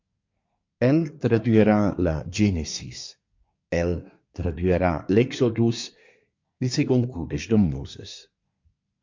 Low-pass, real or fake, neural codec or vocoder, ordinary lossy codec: 7.2 kHz; fake; codec, 24 kHz, 1 kbps, SNAC; MP3, 48 kbps